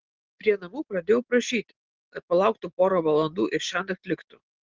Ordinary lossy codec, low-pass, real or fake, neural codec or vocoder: Opus, 16 kbps; 7.2 kHz; real; none